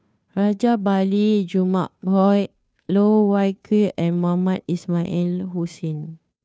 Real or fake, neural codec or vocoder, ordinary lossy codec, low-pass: fake; codec, 16 kHz, 2 kbps, FunCodec, trained on Chinese and English, 25 frames a second; none; none